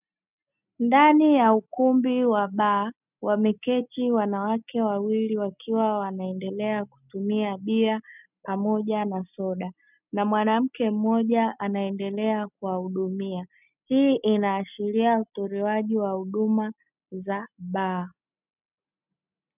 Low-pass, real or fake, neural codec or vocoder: 3.6 kHz; real; none